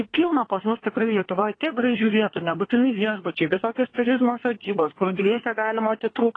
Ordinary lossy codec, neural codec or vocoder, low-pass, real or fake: AAC, 32 kbps; codec, 24 kHz, 1 kbps, SNAC; 9.9 kHz; fake